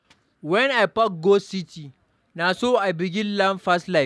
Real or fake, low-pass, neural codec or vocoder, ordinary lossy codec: real; none; none; none